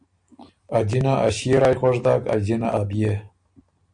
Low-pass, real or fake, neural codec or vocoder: 9.9 kHz; real; none